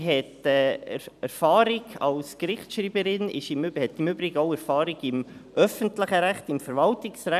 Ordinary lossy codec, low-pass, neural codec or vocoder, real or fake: none; 14.4 kHz; vocoder, 44.1 kHz, 128 mel bands every 256 samples, BigVGAN v2; fake